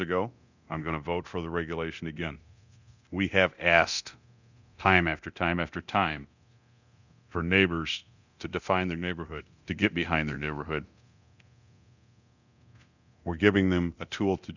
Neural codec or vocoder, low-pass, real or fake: codec, 24 kHz, 0.9 kbps, DualCodec; 7.2 kHz; fake